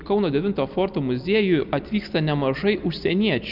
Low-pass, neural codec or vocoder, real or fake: 5.4 kHz; none; real